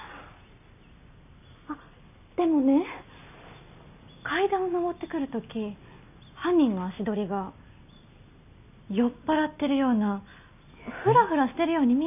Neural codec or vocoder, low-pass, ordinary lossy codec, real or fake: none; 3.6 kHz; none; real